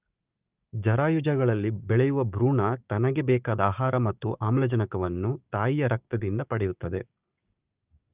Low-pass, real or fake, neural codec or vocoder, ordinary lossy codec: 3.6 kHz; fake; codec, 16 kHz, 6 kbps, DAC; Opus, 24 kbps